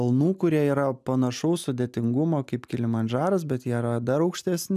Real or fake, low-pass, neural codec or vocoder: real; 14.4 kHz; none